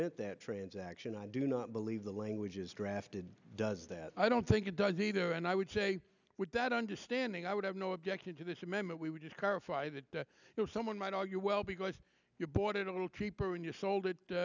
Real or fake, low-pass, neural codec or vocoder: real; 7.2 kHz; none